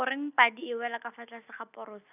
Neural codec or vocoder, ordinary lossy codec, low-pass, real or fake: none; none; 3.6 kHz; real